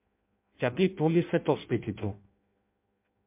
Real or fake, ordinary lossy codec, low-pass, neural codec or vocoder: fake; MP3, 32 kbps; 3.6 kHz; codec, 16 kHz in and 24 kHz out, 0.6 kbps, FireRedTTS-2 codec